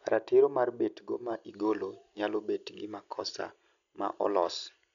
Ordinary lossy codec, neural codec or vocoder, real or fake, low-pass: none; none; real; 7.2 kHz